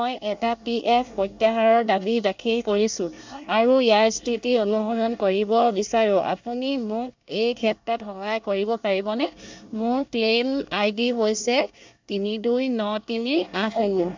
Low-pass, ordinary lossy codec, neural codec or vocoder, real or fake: 7.2 kHz; MP3, 64 kbps; codec, 24 kHz, 1 kbps, SNAC; fake